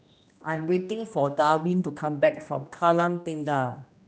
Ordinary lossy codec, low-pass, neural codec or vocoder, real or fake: none; none; codec, 16 kHz, 1 kbps, X-Codec, HuBERT features, trained on general audio; fake